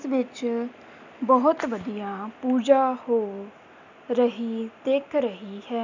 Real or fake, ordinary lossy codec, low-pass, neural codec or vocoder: real; none; 7.2 kHz; none